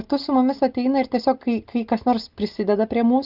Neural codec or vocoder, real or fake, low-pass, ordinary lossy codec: none; real; 5.4 kHz; Opus, 24 kbps